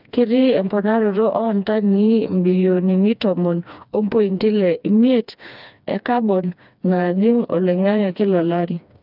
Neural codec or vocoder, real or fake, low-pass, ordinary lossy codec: codec, 16 kHz, 2 kbps, FreqCodec, smaller model; fake; 5.4 kHz; none